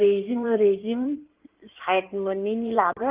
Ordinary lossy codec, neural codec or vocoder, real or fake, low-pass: Opus, 24 kbps; codec, 16 kHz in and 24 kHz out, 2.2 kbps, FireRedTTS-2 codec; fake; 3.6 kHz